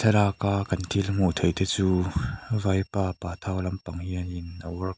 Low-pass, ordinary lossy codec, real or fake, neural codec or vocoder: none; none; real; none